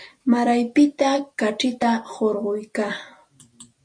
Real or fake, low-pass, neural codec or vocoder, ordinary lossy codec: real; 9.9 kHz; none; MP3, 48 kbps